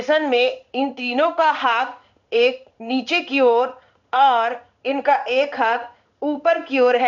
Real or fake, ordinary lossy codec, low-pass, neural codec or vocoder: fake; none; 7.2 kHz; codec, 16 kHz in and 24 kHz out, 1 kbps, XY-Tokenizer